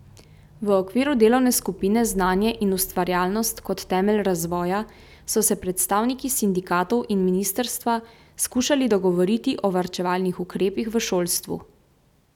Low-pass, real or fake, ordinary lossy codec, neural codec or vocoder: 19.8 kHz; real; none; none